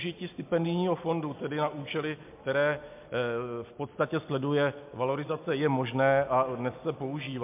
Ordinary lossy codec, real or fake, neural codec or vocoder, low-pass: MP3, 32 kbps; real; none; 3.6 kHz